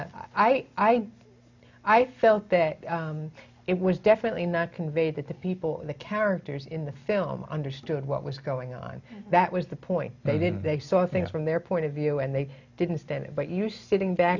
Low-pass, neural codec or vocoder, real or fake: 7.2 kHz; none; real